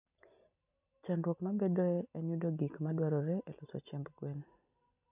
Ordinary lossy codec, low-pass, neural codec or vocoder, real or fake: none; 3.6 kHz; none; real